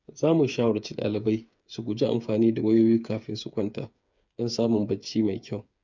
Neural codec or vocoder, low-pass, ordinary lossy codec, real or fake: codec, 16 kHz, 8 kbps, FreqCodec, smaller model; 7.2 kHz; none; fake